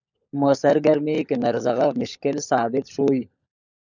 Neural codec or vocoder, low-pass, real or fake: codec, 16 kHz, 16 kbps, FunCodec, trained on LibriTTS, 50 frames a second; 7.2 kHz; fake